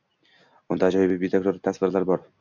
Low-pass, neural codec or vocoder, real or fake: 7.2 kHz; none; real